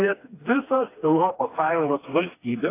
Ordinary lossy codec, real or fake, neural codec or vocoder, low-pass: AAC, 24 kbps; fake; codec, 16 kHz, 2 kbps, FreqCodec, smaller model; 3.6 kHz